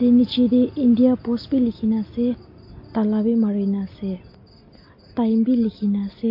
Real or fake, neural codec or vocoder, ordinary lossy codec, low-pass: real; none; MP3, 32 kbps; 5.4 kHz